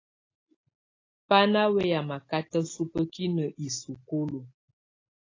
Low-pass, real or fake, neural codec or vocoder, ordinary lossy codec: 7.2 kHz; real; none; AAC, 32 kbps